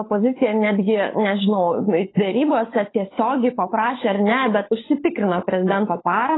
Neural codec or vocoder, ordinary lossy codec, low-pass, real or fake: codec, 16 kHz, 16 kbps, FunCodec, trained on LibriTTS, 50 frames a second; AAC, 16 kbps; 7.2 kHz; fake